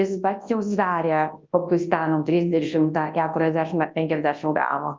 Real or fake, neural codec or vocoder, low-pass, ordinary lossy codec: fake; codec, 24 kHz, 0.9 kbps, WavTokenizer, large speech release; 7.2 kHz; Opus, 32 kbps